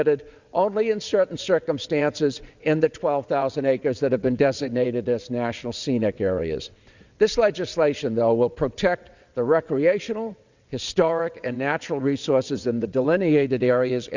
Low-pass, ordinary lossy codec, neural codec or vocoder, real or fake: 7.2 kHz; Opus, 64 kbps; vocoder, 22.05 kHz, 80 mel bands, WaveNeXt; fake